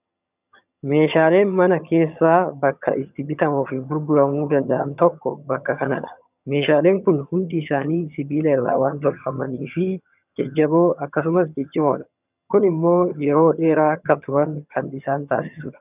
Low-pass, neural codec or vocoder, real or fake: 3.6 kHz; vocoder, 22.05 kHz, 80 mel bands, HiFi-GAN; fake